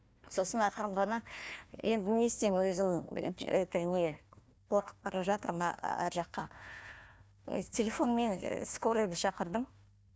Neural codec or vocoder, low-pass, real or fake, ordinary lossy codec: codec, 16 kHz, 1 kbps, FunCodec, trained on Chinese and English, 50 frames a second; none; fake; none